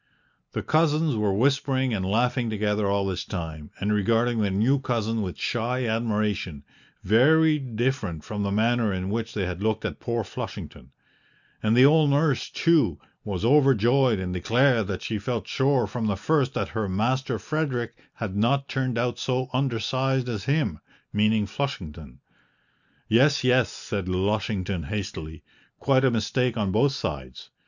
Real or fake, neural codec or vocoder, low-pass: real; none; 7.2 kHz